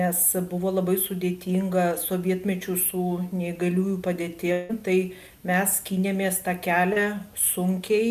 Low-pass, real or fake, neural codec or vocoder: 14.4 kHz; real; none